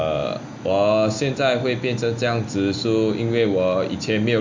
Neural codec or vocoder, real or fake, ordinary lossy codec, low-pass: none; real; MP3, 64 kbps; 7.2 kHz